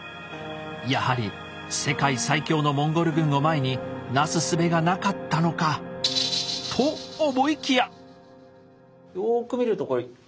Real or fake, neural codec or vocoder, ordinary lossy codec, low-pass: real; none; none; none